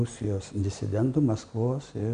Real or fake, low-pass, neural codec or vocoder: real; 9.9 kHz; none